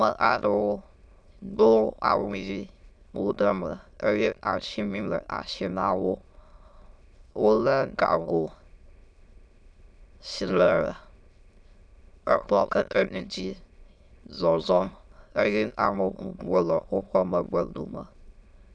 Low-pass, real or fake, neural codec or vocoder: 9.9 kHz; fake; autoencoder, 22.05 kHz, a latent of 192 numbers a frame, VITS, trained on many speakers